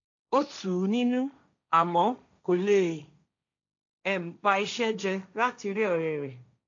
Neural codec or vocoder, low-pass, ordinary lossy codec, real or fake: codec, 16 kHz, 1.1 kbps, Voila-Tokenizer; 7.2 kHz; MP3, 64 kbps; fake